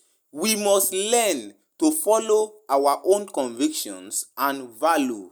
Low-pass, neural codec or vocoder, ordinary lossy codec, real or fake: none; none; none; real